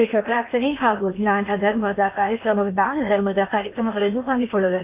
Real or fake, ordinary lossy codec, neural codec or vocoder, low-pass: fake; none; codec, 16 kHz in and 24 kHz out, 0.8 kbps, FocalCodec, streaming, 65536 codes; 3.6 kHz